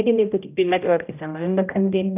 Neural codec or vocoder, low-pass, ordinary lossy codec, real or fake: codec, 16 kHz, 0.5 kbps, X-Codec, HuBERT features, trained on general audio; 3.6 kHz; none; fake